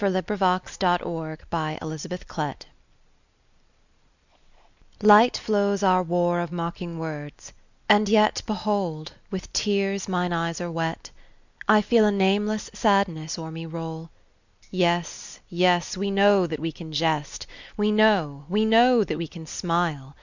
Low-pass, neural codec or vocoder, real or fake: 7.2 kHz; none; real